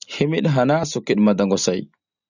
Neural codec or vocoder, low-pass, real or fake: none; 7.2 kHz; real